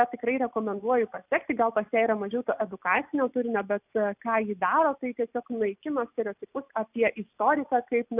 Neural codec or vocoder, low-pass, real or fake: none; 3.6 kHz; real